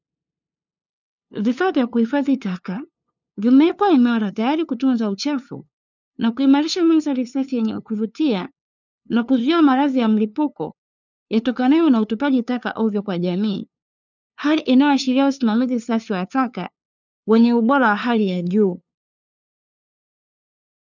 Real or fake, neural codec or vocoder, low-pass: fake; codec, 16 kHz, 2 kbps, FunCodec, trained on LibriTTS, 25 frames a second; 7.2 kHz